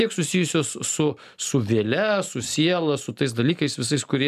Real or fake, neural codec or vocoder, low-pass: real; none; 14.4 kHz